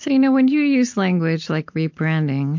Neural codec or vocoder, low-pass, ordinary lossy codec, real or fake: none; 7.2 kHz; MP3, 48 kbps; real